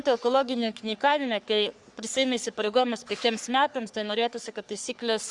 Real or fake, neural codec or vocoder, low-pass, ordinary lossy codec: fake; codec, 44.1 kHz, 3.4 kbps, Pupu-Codec; 10.8 kHz; Opus, 64 kbps